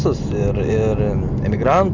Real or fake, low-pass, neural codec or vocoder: real; 7.2 kHz; none